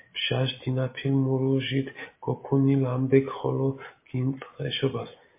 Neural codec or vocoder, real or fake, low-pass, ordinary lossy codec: none; real; 3.6 kHz; MP3, 24 kbps